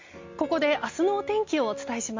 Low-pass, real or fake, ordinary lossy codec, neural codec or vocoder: 7.2 kHz; real; MP3, 48 kbps; none